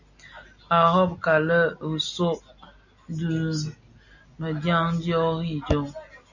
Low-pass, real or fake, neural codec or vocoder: 7.2 kHz; real; none